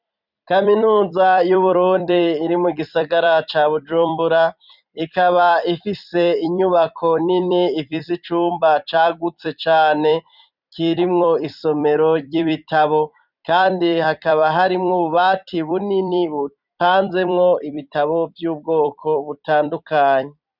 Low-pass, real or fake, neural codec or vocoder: 5.4 kHz; fake; vocoder, 44.1 kHz, 128 mel bands every 256 samples, BigVGAN v2